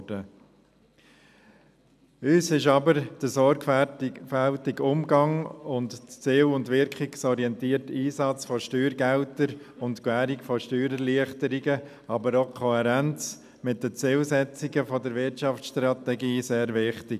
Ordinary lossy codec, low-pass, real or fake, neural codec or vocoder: none; 14.4 kHz; real; none